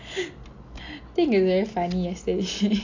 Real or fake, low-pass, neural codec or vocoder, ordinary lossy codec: real; 7.2 kHz; none; AAC, 48 kbps